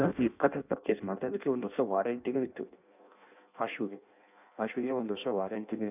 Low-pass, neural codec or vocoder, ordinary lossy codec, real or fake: 3.6 kHz; codec, 16 kHz in and 24 kHz out, 0.6 kbps, FireRedTTS-2 codec; none; fake